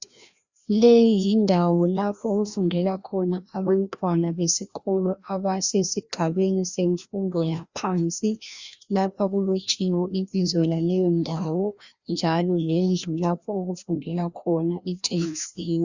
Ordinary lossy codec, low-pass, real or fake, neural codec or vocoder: Opus, 64 kbps; 7.2 kHz; fake; codec, 16 kHz, 1 kbps, FreqCodec, larger model